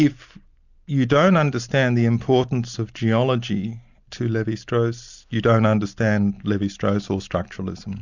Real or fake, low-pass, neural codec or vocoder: real; 7.2 kHz; none